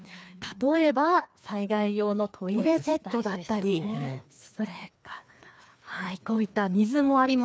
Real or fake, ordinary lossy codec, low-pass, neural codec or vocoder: fake; none; none; codec, 16 kHz, 2 kbps, FreqCodec, larger model